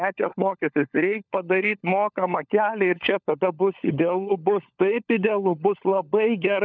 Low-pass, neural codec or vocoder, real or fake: 7.2 kHz; codec, 16 kHz, 16 kbps, FunCodec, trained on LibriTTS, 50 frames a second; fake